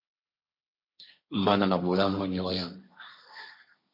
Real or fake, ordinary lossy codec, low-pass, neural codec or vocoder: fake; MP3, 32 kbps; 5.4 kHz; codec, 16 kHz, 1.1 kbps, Voila-Tokenizer